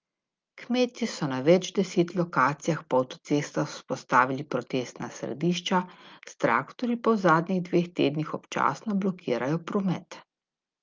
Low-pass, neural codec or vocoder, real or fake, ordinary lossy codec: 7.2 kHz; none; real; Opus, 24 kbps